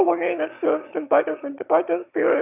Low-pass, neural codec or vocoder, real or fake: 3.6 kHz; autoencoder, 22.05 kHz, a latent of 192 numbers a frame, VITS, trained on one speaker; fake